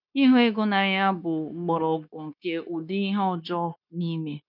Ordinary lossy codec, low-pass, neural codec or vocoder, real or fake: none; 5.4 kHz; codec, 16 kHz, 0.9 kbps, LongCat-Audio-Codec; fake